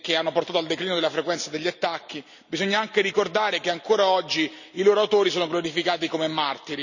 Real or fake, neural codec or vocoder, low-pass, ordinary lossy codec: real; none; 7.2 kHz; none